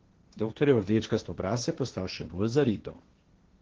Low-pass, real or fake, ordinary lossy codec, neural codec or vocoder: 7.2 kHz; fake; Opus, 16 kbps; codec, 16 kHz, 1.1 kbps, Voila-Tokenizer